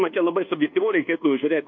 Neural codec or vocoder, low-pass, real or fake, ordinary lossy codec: codec, 24 kHz, 1.2 kbps, DualCodec; 7.2 kHz; fake; MP3, 48 kbps